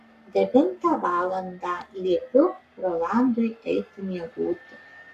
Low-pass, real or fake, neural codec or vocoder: 14.4 kHz; fake; codec, 44.1 kHz, 7.8 kbps, Pupu-Codec